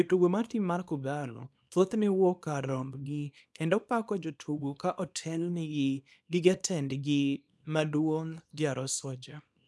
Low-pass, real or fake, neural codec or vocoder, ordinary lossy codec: none; fake; codec, 24 kHz, 0.9 kbps, WavTokenizer, small release; none